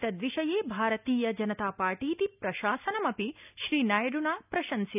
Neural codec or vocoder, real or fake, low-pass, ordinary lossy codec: none; real; 3.6 kHz; none